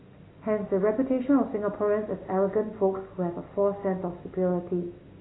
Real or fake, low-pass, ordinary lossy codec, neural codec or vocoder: real; 7.2 kHz; AAC, 16 kbps; none